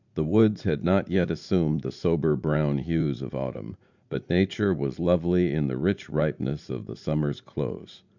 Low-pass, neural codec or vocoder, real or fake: 7.2 kHz; vocoder, 44.1 kHz, 80 mel bands, Vocos; fake